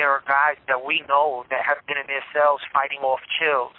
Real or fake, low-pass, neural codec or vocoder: real; 5.4 kHz; none